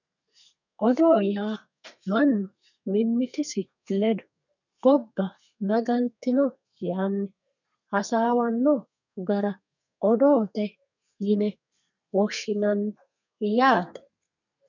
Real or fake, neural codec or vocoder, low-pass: fake; codec, 32 kHz, 1.9 kbps, SNAC; 7.2 kHz